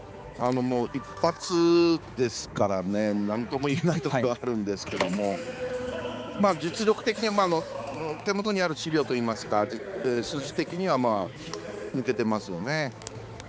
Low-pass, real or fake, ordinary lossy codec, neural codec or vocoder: none; fake; none; codec, 16 kHz, 4 kbps, X-Codec, HuBERT features, trained on balanced general audio